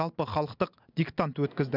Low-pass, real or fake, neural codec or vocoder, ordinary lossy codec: 5.4 kHz; real; none; none